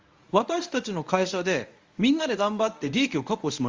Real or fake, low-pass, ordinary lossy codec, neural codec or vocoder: fake; 7.2 kHz; Opus, 32 kbps; codec, 24 kHz, 0.9 kbps, WavTokenizer, medium speech release version 1